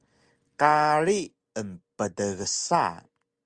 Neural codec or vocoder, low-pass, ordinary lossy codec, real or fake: none; 9.9 kHz; Opus, 32 kbps; real